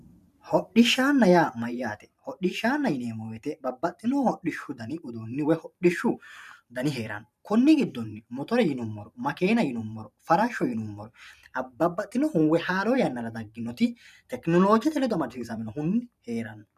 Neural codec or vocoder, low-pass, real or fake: vocoder, 44.1 kHz, 128 mel bands every 512 samples, BigVGAN v2; 14.4 kHz; fake